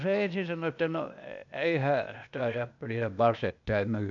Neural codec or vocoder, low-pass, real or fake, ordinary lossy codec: codec, 16 kHz, 0.8 kbps, ZipCodec; 7.2 kHz; fake; none